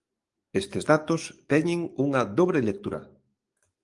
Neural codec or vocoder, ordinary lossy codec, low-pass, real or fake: codec, 44.1 kHz, 7.8 kbps, DAC; Opus, 32 kbps; 10.8 kHz; fake